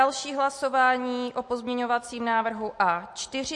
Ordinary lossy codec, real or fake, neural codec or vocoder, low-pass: MP3, 48 kbps; real; none; 10.8 kHz